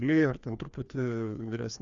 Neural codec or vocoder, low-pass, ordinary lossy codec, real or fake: codec, 16 kHz, 2 kbps, FreqCodec, larger model; 7.2 kHz; Opus, 64 kbps; fake